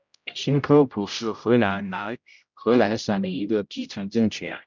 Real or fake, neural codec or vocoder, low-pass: fake; codec, 16 kHz, 0.5 kbps, X-Codec, HuBERT features, trained on general audio; 7.2 kHz